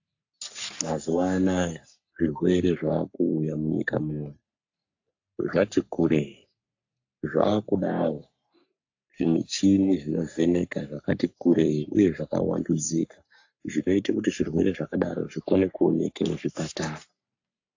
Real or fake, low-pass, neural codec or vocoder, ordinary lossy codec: fake; 7.2 kHz; codec, 44.1 kHz, 3.4 kbps, Pupu-Codec; AAC, 48 kbps